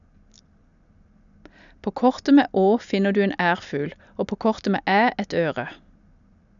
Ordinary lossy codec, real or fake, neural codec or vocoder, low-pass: none; real; none; 7.2 kHz